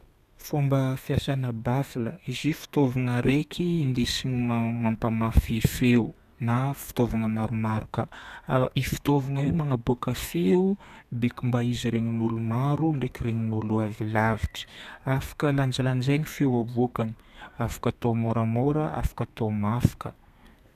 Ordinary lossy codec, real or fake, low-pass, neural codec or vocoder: none; fake; 14.4 kHz; codec, 32 kHz, 1.9 kbps, SNAC